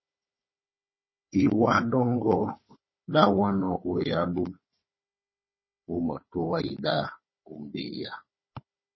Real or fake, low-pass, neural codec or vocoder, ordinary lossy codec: fake; 7.2 kHz; codec, 16 kHz, 4 kbps, FunCodec, trained on Chinese and English, 50 frames a second; MP3, 24 kbps